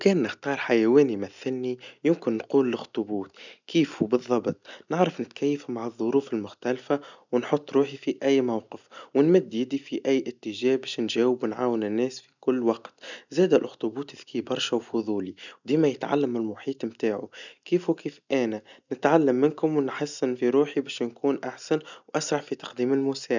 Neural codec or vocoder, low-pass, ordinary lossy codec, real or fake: none; 7.2 kHz; none; real